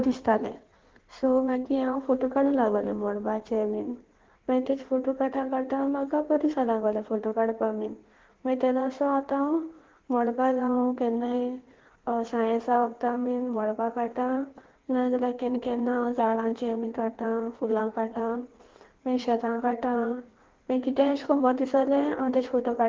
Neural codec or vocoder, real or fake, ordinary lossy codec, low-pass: codec, 16 kHz in and 24 kHz out, 1.1 kbps, FireRedTTS-2 codec; fake; Opus, 16 kbps; 7.2 kHz